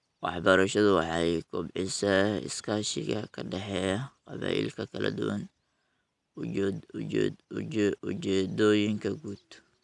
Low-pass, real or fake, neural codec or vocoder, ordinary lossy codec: 10.8 kHz; real; none; none